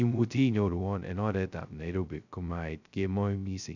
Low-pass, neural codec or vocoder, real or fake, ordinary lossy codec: 7.2 kHz; codec, 16 kHz, 0.2 kbps, FocalCodec; fake; none